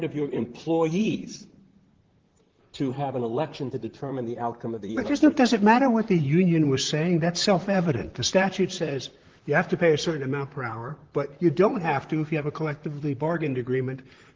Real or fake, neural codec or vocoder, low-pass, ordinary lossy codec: fake; vocoder, 44.1 kHz, 128 mel bands, Pupu-Vocoder; 7.2 kHz; Opus, 16 kbps